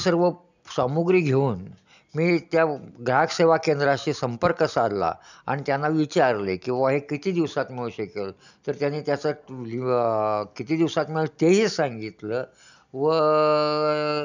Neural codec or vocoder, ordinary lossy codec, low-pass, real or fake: none; none; 7.2 kHz; real